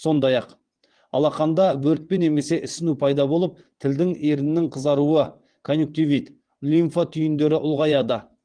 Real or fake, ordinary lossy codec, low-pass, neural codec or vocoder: fake; Opus, 24 kbps; 9.9 kHz; vocoder, 22.05 kHz, 80 mel bands, WaveNeXt